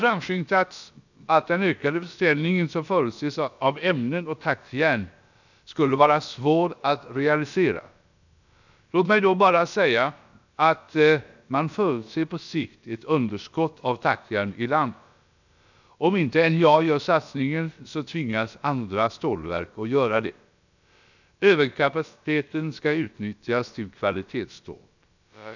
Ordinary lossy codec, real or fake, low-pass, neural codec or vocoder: none; fake; 7.2 kHz; codec, 16 kHz, about 1 kbps, DyCAST, with the encoder's durations